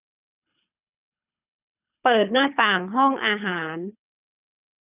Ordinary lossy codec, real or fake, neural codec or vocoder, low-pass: none; fake; codec, 24 kHz, 3 kbps, HILCodec; 3.6 kHz